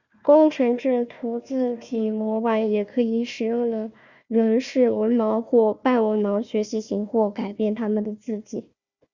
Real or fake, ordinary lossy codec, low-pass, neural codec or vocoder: fake; Opus, 64 kbps; 7.2 kHz; codec, 16 kHz, 1 kbps, FunCodec, trained on Chinese and English, 50 frames a second